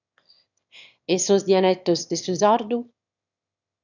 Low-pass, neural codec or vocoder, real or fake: 7.2 kHz; autoencoder, 22.05 kHz, a latent of 192 numbers a frame, VITS, trained on one speaker; fake